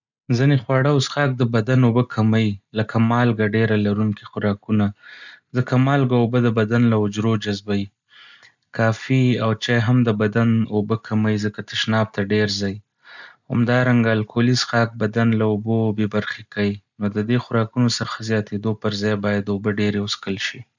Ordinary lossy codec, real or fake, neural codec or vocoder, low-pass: none; real; none; 7.2 kHz